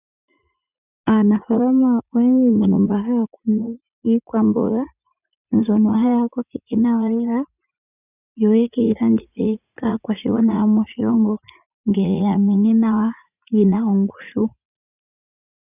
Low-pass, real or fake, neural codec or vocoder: 3.6 kHz; fake; vocoder, 44.1 kHz, 80 mel bands, Vocos